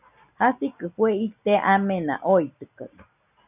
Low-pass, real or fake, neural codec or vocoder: 3.6 kHz; real; none